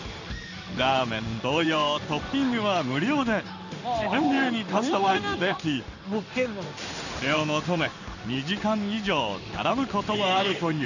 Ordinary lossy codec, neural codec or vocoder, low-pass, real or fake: none; codec, 16 kHz in and 24 kHz out, 1 kbps, XY-Tokenizer; 7.2 kHz; fake